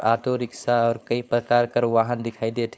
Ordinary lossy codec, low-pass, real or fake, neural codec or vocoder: none; none; fake; codec, 16 kHz, 4.8 kbps, FACodec